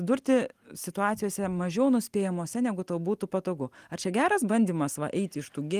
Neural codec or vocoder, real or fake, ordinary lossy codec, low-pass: none; real; Opus, 24 kbps; 14.4 kHz